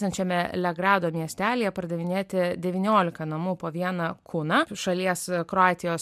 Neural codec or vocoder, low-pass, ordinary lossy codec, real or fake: none; 14.4 kHz; MP3, 96 kbps; real